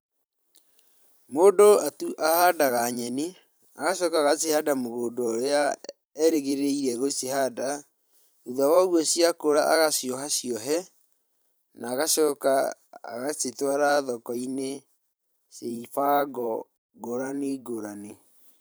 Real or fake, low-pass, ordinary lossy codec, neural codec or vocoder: fake; none; none; vocoder, 44.1 kHz, 128 mel bands every 512 samples, BigVGAN v2